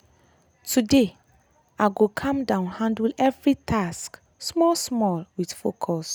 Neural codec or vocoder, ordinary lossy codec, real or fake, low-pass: none; none; real; none